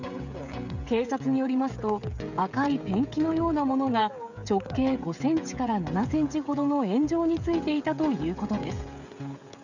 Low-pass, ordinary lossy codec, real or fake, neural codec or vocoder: 7.2 kHz; none; fake; codec, 16 kHz, 16 kbps, FreqCodec, smaller model